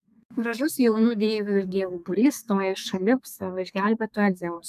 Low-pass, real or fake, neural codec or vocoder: 14.4 kHz; fake; codec, 32 kHz, 1.9 kbps, SNAC